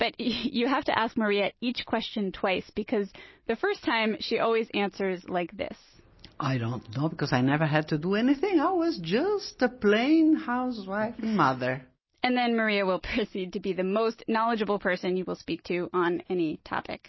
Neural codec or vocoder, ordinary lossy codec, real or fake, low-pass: none; MP3, 24 kbps; real; 7.2 kHz